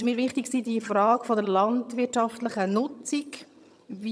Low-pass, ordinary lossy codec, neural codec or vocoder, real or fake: none; none; vocoder, 22.05 kHz, 80 mel bands, HiFi-GAN; fake